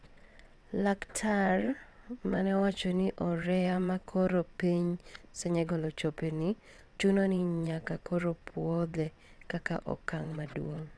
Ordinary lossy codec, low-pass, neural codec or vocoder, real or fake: none; none; vocoder, 22.05 kHz, 80 mel bands, WaveNeXt; fake